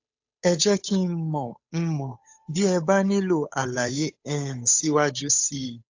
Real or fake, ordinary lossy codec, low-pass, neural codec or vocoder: fake; AAC, 48 kbps; 7.2 kHz; codec, 16 kHz, 8 kbps, FunCodec, trained on Chinese and English, 25 frames a second